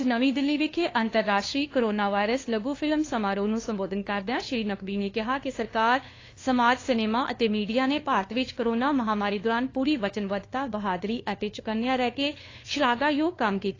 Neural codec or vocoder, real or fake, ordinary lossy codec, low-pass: codec, 16 kHz, 2 kbps, FunCodec, trained on LibriTTS, 25 frames a second; fake; AAC, 32 kbps; 7.2 kHz